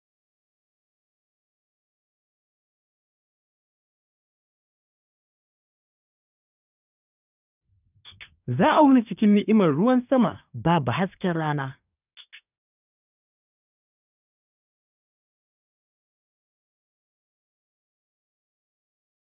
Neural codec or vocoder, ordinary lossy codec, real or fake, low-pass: codec, 24 kHz, 1 kbps, SNAC; none; fake; 3.6 kHz